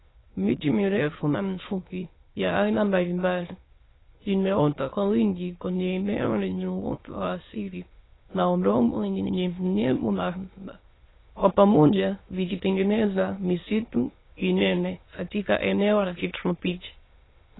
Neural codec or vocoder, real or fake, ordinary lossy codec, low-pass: autoencoder, 22.05 kHz, a latent of 192 numbers a frame, VITS, trained on many speakers; fake; AAC, 16 kbps; 7.2 kHz